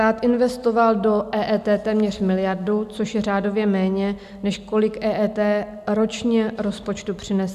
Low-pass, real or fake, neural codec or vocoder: 14.4 kHz; real; none